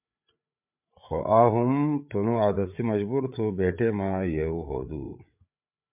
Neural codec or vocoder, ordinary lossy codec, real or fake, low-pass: codec, 16 kHz, 8 kbps, FreqCodec, larger model; MP3, 32 kbps; fake; 3.6 kHz